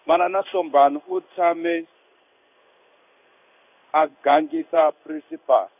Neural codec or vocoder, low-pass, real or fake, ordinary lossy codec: codec, 16 kHz in and 24 kHz out, 1 kbps, XY-Tokenizer; 3.6 kHz; fake; none